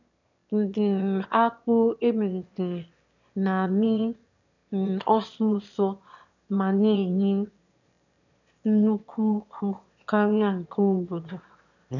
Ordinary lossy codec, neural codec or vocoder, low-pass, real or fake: none; autoencoder, 22.05 kHz, a latent of 192 numbers a frame, VITS, trained on one speaker; 7.2 kHz; fake